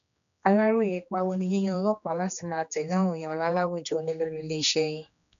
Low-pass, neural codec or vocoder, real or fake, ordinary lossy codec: 7.2 kHz; codec, 16 kHz, 2 kbps, X-Codec, HuBERT features, trained on general audio; fake; none